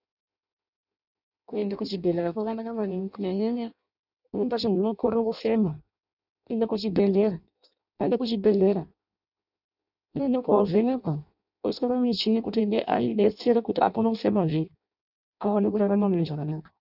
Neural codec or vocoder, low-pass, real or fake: codec, 16 kHz in and 24 kHz out, 0.6 kbps, FireRedTTS-2 codec; 5.4 kHz; fake